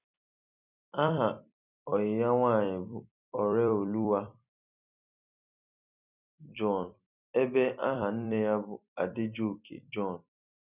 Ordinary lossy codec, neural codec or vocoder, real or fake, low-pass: none; vocoder, 44.1 kHz, 128 mel bands every 256 samples, BigVGAN v2; fake; 3.6 kHz